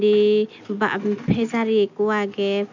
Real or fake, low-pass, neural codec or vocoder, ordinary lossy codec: real; 7.2 kHz; none; none